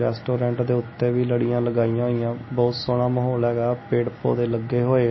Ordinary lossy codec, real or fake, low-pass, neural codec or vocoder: MP3, 24 kbps; real; 7.2 kHz; none